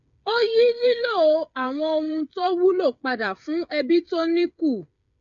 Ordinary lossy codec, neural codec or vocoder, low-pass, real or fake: none; codec, 16 kHz, 8 kbps, FreqCodec, smaller model; 7.2 kHz; fake